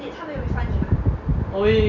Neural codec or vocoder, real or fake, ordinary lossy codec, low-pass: none; real; none; 7.2 kHz